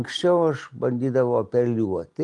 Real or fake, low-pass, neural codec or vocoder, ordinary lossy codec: real; 10.8 kHz; none; Opus, 24 kbps